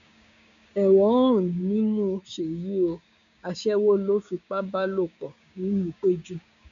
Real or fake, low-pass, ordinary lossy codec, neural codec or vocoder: fake; 7.2 kHz; MP3, 64 kbps; codec, 16 kHz, 6 kbps, DAC